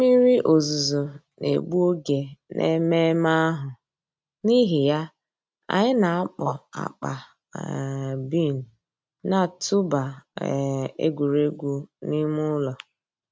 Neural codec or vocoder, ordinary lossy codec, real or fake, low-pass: none; none; real; none